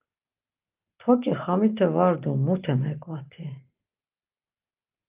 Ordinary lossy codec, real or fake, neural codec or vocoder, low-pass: Opus, 32 kbps; real; none; 3.6 kHz